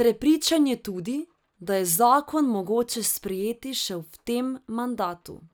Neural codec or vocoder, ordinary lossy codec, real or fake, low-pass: none; none; real; none